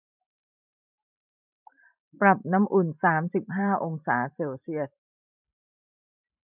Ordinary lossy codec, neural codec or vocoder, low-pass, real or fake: none; vocoder, 44.1 kHz, 128 mel bands every 512 samples, BigVGAN v2; 3.6 kHz; fake